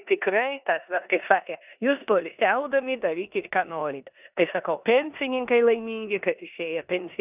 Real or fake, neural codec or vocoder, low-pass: fake; codec, 16 kHz in and 24 kHz out, 0.9 kbps, LongCat-Audio-Codec, four codebook decoder; 3.6 kHz